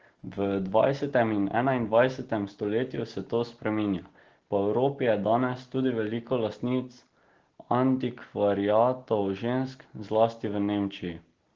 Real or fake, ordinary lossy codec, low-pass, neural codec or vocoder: real; Opus, 16 kbps; 7.2 kHz; none